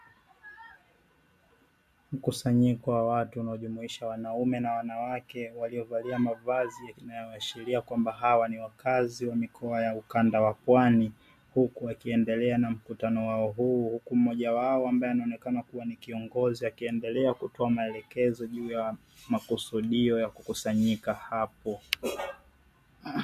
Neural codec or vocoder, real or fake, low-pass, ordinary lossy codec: none; real; 14.4 kHz; MP3, 64 kbps